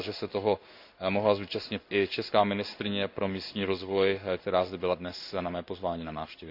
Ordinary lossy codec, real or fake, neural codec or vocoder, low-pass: none; fake; codec, 16 kHz in and 24 kHz out, 1 kbps, XY-Tokenizer; 5.4 kHz